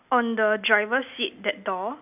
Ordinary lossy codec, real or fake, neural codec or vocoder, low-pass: none; real; none; 3.6 kHz